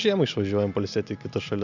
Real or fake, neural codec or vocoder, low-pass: real; none; 7.2 kHz